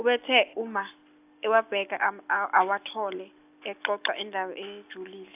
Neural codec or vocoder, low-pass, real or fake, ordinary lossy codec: none; 3.6 kHz; real; AAC, 32 kbps